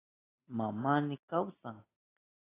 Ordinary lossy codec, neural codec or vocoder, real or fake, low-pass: AAC, 16 kbps; none; real; 3.6 kHz